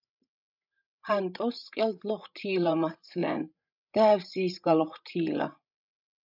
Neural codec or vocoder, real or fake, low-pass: codec, 16 kHz, 16 kbps, FreqCodec, larger model; fake; 5.4 kHz